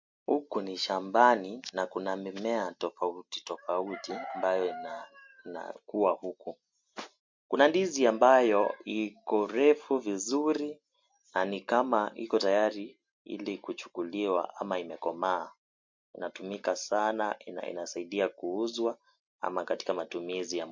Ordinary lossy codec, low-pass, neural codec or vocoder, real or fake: MP3, 48 kbps; 7.2 kHz; none; real